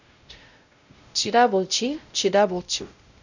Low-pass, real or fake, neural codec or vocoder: 7.2 kHz; fake; codec, 16 kHz, 0.5 kbps, X-Codec, WavLM features, trained on Multilingual LibriSpeech